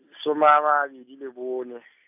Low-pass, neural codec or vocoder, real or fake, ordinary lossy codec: 3.6 kHz; none; real; AAC, 32 kbps